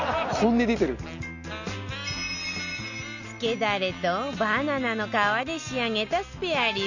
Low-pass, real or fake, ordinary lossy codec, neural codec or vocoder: 7.2 kHz; real; none; none